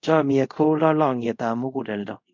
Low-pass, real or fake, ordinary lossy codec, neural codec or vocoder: 7.2 kHz; fake; MP3, 64 kbps; codec, 24 kHz, 0.5 kbps, DualCodec